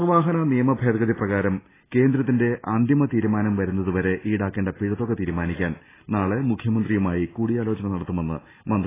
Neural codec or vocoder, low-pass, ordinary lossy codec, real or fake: none; 3.6 kHz; AAC, 16 kbps; real